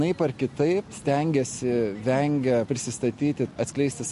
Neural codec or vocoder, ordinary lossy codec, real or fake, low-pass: none; MP3, 48 kbps; real; 14.4 kHz